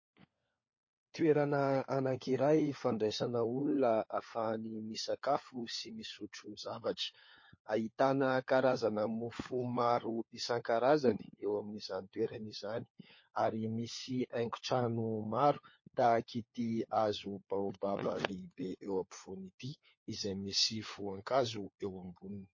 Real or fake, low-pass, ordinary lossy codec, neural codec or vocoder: fake; 7.2 kHz; MP3, 32 kbps; codec, 16 kHz, 4 kbps, FunCodec, trained on LibriTTS, 50 frames a second